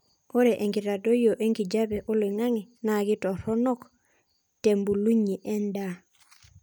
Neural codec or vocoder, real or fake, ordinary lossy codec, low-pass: none; real; none; none